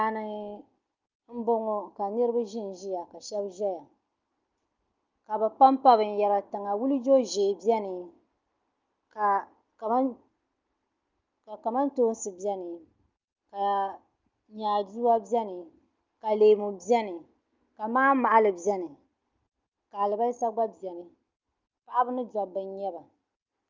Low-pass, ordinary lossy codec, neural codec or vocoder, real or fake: 7.2 kHz; Opus, 24 kbps; none; real